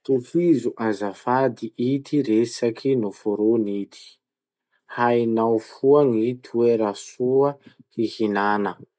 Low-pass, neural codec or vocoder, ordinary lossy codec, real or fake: none; none; none; real